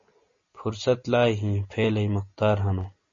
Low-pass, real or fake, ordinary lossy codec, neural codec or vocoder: 7.2 kHz; real; MP3, 32 kbps; none